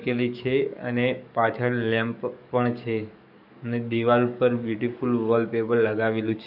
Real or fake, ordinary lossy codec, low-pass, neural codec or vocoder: fake; none; 5.4 kHz; codec, 44.1 kHz, 7.8 kbps, DAC